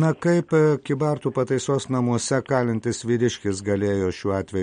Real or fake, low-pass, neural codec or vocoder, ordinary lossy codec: real; 9.9 kHz; none; MP3, 48 kbps